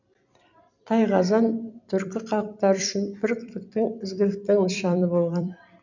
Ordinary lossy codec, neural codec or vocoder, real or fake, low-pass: none; vocoder, 44.1 kHz, 128 mel bands every 256 samples, BigVGAN v2; fake; 7.2 kHz